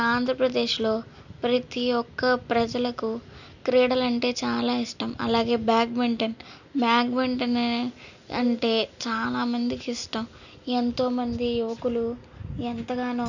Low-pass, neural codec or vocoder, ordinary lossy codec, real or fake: 7.2 kHz; none; none; real